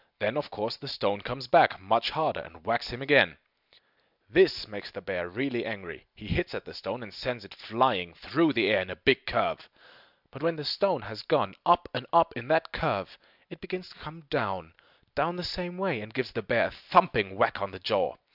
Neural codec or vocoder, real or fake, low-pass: none; real; 5.4 kHz